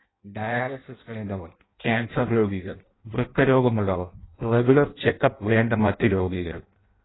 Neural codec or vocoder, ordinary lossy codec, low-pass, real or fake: codec, 16 kHz in and 24 kHz out, 0.6 kbps, FireRedTTS-2 codec; AAC, 16 kbps; 7.2 kHz; fake